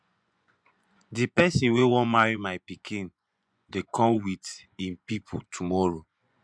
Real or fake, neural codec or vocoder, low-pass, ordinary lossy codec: fake; vocoder, 24 kHz, 100 mel bands, Vocos; 9.9 kHz; none